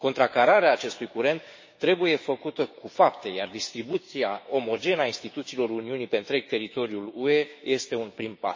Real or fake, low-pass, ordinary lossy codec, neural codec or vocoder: fake; 7.2 kHz; MP3, 32 kbps; codec, 16 kHz, 6 kbps, DAC